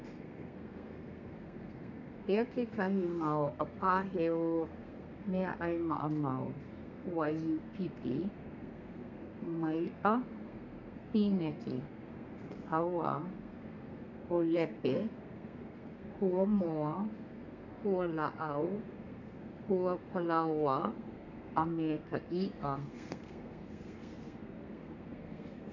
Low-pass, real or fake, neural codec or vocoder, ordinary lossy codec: 7.2 kHz; fake; codec, 32 kHz, 1.9 kbps, SNAC; none